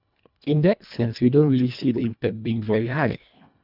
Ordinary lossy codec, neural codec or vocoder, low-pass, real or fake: none; codec, 24 kHz, 1.5 kbps, HILCodec; 5.4 kHz; fake